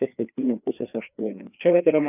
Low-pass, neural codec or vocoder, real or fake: 3.6 kHz; codec, 16 kHz, 2 kbps, FreqCodec, larger model; fake